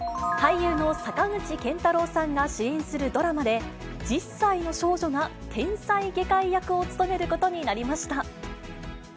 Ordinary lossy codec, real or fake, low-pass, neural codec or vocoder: none; real; none; none